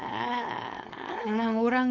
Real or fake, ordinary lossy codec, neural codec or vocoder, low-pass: fake; none; codec, 16 kHz, 4.8 kbps, FACodec; 7.2 kHz